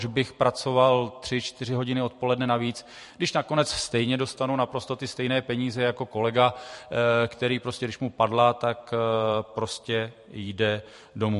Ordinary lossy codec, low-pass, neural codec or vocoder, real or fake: MP3, 48 kbps; 14.4 kHz; vocoder, 44.1 kHz, 128 mel bands every 512 samples, BigVGAN v2; fake